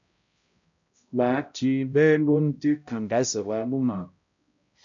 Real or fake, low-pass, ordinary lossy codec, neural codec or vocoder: fake; 7.2 kHz; AAC, 64 kbps; codec, 16 kHz, 0.5 kbps, X-Codec, HuBERT features, trained on balanced general audio